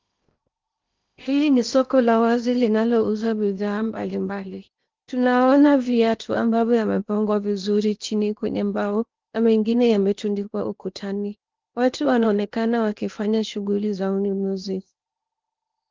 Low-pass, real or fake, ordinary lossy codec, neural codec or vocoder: 7.2 kHz; fake; Opus, 32 kbps; codec, 16 kHz in and 24 kHz out, 0.8 kbps, FocalCodec, streaming, 65536 codes